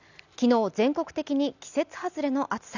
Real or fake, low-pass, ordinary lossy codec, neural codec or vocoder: real; 7.2 kHz; none; none